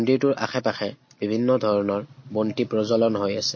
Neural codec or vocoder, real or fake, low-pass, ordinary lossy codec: none; real; 7.2 kHz; MP3, 32 kbps